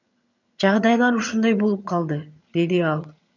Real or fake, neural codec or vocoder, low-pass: fake; vocoder, 22.05 kHz, 80 mel bands, HiFi-GAN; 7.2 kHz